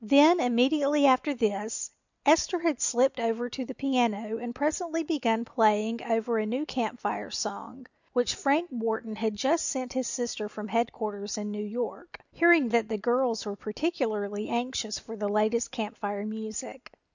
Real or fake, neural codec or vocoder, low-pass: real; none; 7.2 kHz